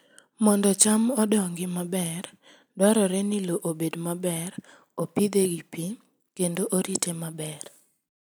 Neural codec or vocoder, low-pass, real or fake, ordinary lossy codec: none; none; real; none